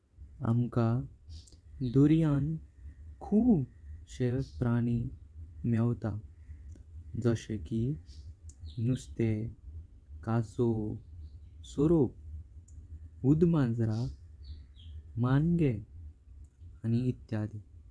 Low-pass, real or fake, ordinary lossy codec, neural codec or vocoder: none; fake; none; vocoder, 22.05 kHz, 80 mel bands, WaveNeXt